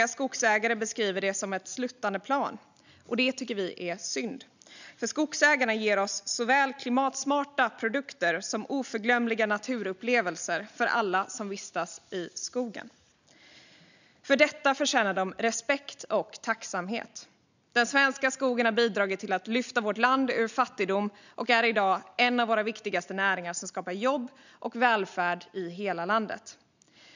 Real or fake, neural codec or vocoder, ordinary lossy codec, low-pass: real; none; none; 7.2 kHz